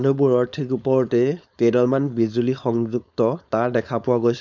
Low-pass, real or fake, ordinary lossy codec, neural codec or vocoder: 7.2 kHz; fake; none; codec, 16 kHz, 4.8 kbps, FACodec